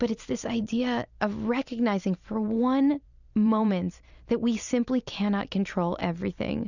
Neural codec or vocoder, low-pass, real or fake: vocoder, 44.1 kHz, 128 mel bands every 256 samples, BigVGAN v2; 7.2 kHz; fake